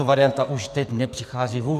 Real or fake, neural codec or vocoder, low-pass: fake; codec, 44.1 kHz, 2.6 kbps, SNAC; 14.4 kHz